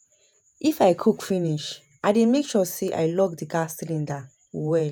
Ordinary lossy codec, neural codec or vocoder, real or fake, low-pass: none; vocoder, 48 kHz, 128 mel bands, Vocos; fake; none